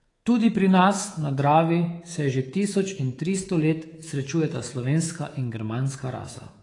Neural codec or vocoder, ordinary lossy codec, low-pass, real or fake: codec, 24 kHz, 3.1 kbps, DualCodec; AAC, 32 kbps; 10.8 kHz; fake